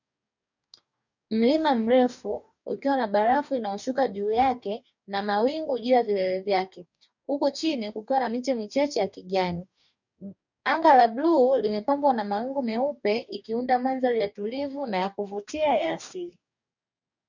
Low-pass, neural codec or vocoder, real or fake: 7.2 kHz; codec, 44.1 kHz, 2.6 kbps, DAC; fake